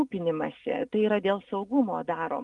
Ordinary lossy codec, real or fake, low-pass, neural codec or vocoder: Opus, 32 kbps; real; 10.8 kHz; none